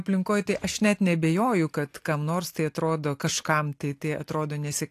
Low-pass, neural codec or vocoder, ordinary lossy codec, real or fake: 14.4 kHz; none; AAC, 64 kbps; real